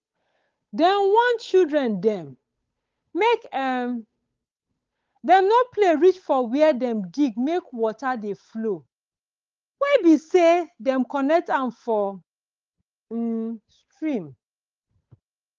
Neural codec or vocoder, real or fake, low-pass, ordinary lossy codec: codec, 16 kHz, 8 kbps, FunCodec, trained on Chinese and English, 25 frames a second; fake; 7.2 kHz; Opus, 24 kbps